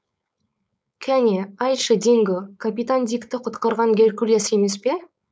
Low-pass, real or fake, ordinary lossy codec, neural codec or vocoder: none; fake; none; codec, 16 kHz, 4.8 kbps, FACodec